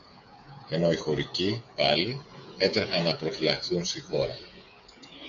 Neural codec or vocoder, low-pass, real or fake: codec, 16 kHz, 8 kbps, FreqCodec, smaller model; 7.2 kHz; fake